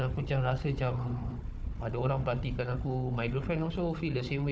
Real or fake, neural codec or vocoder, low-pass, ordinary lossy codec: fake; codec, 16 kHz, 4 kbps, FunCodec, trained on Chinese and English, 50 frames a second; none; none